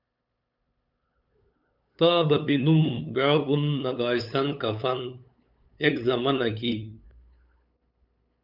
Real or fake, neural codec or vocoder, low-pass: fake; codec, 16 kHz, 8 kbps, FunCodec, trained on LibriTTS, 25 frames a second; 5.4 kHz